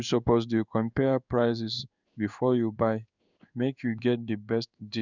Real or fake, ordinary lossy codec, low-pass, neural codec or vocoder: fake; none; 7.2 kHz; codec, 16 kHz in and 24 kHz out, 1 kbps, XY-Tokenizer